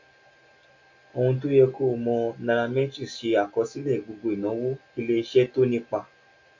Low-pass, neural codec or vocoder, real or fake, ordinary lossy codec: 7.2 kHz; none; real; MP3, 48 kbps